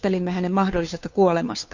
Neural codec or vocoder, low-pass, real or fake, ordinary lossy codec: codec, 16 kHz, 2 kbps, FunCodec, trained on Chinese and English, 25 frames a second; 7.2 kHz; fake; Opus, 64 kbps